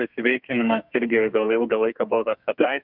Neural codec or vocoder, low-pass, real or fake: codec, 32 kHz, 1.9 kbps, SNAC; 5.4 kHz; fake